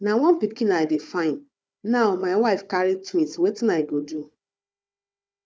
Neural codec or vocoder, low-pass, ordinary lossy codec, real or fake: codec, 16 kHz, 4 kbps, FunCodec, trained on Chinese and English, 50 frames a second; none; none; fake